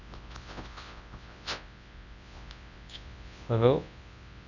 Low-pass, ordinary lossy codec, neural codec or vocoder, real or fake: 7.2 kHz; none; codec, 24 kHz, 0.9 kbps, WavTokenizer, large speech release; fake